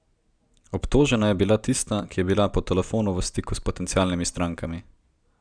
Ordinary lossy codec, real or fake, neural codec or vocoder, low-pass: none; real; none; 9.9 kHz